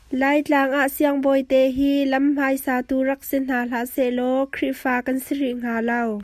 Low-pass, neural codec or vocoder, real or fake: 14.4 kHz; none; real